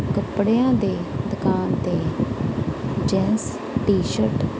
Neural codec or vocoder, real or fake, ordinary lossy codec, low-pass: none; real; none; none